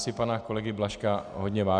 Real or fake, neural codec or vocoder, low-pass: real; none; 9.9 kHz